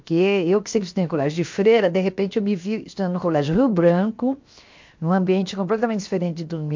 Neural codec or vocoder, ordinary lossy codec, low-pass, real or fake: codec, 16 kHz, 0.7 kbps, FocalCodec; MP3, 48 kbps; 7.2 kHz; fake